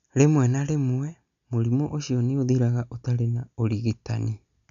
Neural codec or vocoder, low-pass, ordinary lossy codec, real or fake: none; 7.2 kHz; none; real